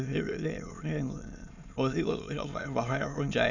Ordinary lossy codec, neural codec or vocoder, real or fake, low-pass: Opus, 64 kbps; autoencoder, 22.05 kHz, a latent of 192 numbers a frame, VITS, trained on many speakers; fake; 7.2 kHz